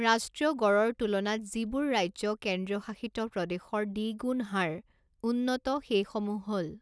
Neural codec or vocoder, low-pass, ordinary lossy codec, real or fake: none; none; none; real